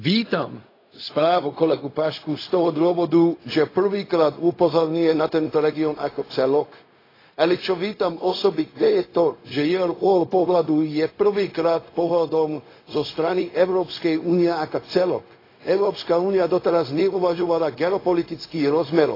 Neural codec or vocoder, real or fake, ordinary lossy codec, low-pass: codec, 16 kHz, 0.4 kbps, LongCat-Audio-Codec; fake; AAC, 24 kbps; 5.4 kHz